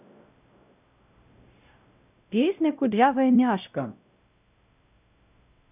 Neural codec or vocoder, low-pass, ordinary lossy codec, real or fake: codec, 16 kHz, 0.5 kbps, X-Codec, WavLM features, trained on Multilingual LibriSpeech; 3.6 kHz; none; fake